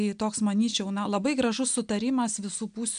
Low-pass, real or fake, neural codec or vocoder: 9.9 kHz; real; none